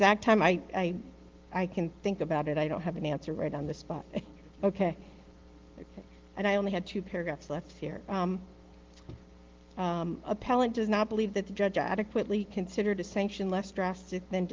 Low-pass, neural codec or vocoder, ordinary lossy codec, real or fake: 7.2 kHz; none; Opus, 16 kbps; real